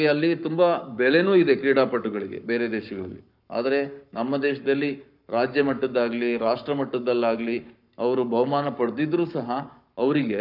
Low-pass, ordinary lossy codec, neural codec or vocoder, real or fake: 5.4 kHz; none; codec, 44.1 kHz, 7.8 kbps, Pupu-Codec; fake